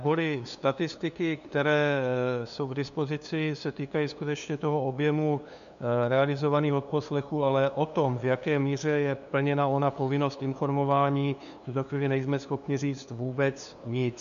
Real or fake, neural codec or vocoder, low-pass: fake; codec, 16 kHz, 2 kbps, FunCodec, trained on LibriTTS, 25 frames a second; 7.2 kHz